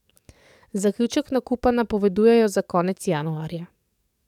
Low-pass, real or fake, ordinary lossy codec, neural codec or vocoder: 19.8 kHz; fake; none; codec, 44.1 kHz, 7.8 kbps, DAC